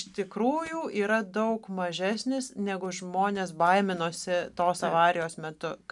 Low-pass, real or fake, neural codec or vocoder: 10.8 kHz; real; none